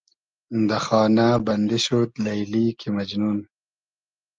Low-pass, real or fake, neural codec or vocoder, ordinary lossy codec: 7.2 kHz; real; none; Opus, 32 kbps